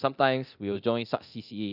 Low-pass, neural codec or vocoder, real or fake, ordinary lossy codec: 5.4 kHz; codec, 24 kHz, 0.9 kbps, DualCodec; fake; none